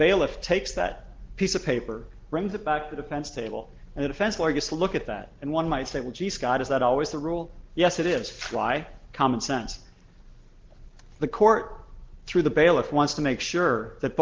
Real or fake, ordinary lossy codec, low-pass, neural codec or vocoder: real; Opus, 16 kbps; 7.2 kHz; none